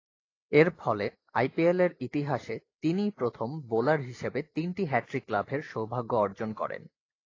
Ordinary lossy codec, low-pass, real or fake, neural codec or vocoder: AAC, 32 kbps; 7.2 kHz; real; none